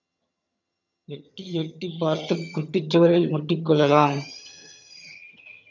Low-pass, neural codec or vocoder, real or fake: 7.2 kHz; vocoder, 22.05 kHz, 80 mel bands, HiFi-GAN; fake